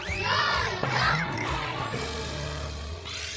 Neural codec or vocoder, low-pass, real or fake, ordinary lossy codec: codec, 16 kHz, 16 kbps, FreqCodec, larger model; none; fake; none